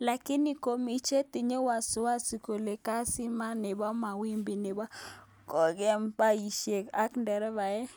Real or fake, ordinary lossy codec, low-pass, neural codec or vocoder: real; none; none; none